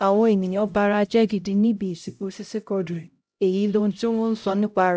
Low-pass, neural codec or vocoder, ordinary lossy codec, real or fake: none; codec, 16 kHz, 0.5 kbps, X-Codec, HuBERT features, trained on LibriSpeech; none; fake